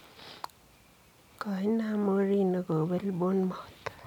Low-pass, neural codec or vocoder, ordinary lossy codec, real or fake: 19.8 kHz; none; none; real